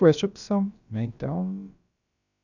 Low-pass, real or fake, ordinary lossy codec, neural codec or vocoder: 7.2 kHz; fake; none; codec, 16 kHz, about 1 kbps, DyCAST, with the encoder's durations